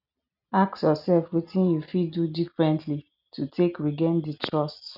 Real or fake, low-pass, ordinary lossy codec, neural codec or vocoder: real; 5.4 kHz; none; none